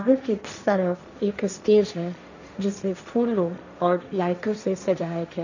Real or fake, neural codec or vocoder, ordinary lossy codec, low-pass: fake; codec, 16 kHz, 1.1 kbps, Voila-Tokenizer; none; 7.2 kHz